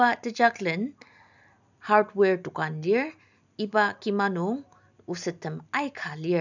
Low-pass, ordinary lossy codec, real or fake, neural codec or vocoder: 7.2 kHz; none; real; none